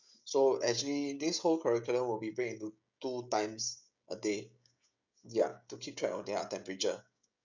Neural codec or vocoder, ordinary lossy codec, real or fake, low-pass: codec, 16 kHz, 16 kbps, FreqCodec, smaller model; none; fake; 7.2 kHz